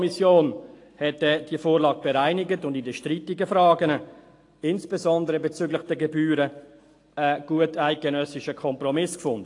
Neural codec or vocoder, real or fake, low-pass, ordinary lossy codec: none; real; 10.8 kHz; AAC, 48 kbps